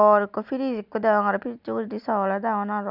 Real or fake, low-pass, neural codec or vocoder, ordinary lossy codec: real; 5.4 kHz; none; none